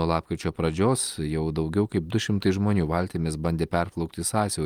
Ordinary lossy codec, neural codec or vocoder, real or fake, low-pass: Opus, 32 kbps; none; real; 19.8 kHz